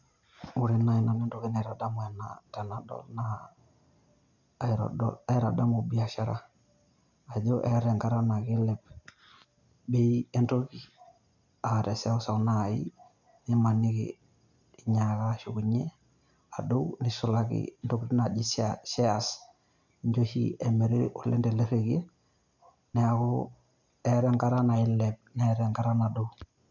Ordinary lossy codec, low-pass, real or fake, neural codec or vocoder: none; 7.2 kHz; real; none